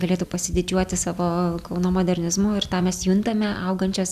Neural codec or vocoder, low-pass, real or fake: none; 14.4 kHz; real